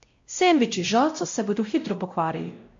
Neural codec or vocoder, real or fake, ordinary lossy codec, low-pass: codec, 16 kHz, 0.5 kbps, X-Codec, WavLM features, trained on Multilingual LibriSpeech; fake; MP3, 48 kbps; 7.2 kHz